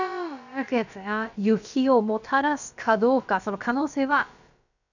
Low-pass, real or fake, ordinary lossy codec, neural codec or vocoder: 7.2 kHz; fake; none; codec, 16 kHz, about 1 kbps, DyCAST, with the encoder's durations